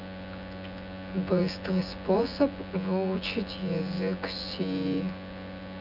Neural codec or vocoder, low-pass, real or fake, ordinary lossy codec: vocoder, 24 kHz, 100 mel bands, Vocos; 5.4 kHz; fake; none